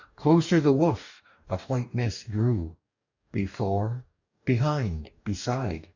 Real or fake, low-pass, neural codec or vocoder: fake; 7.2 kHz; codec, 44.1 kHz, 2.6 kbps, DAC